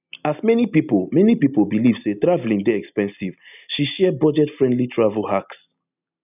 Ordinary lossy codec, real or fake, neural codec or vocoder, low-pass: none; real; none; 3.6 kHz